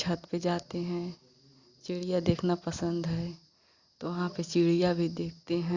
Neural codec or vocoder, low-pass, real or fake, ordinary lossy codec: none; 7.2 kHz; real; Opus, 64 kbps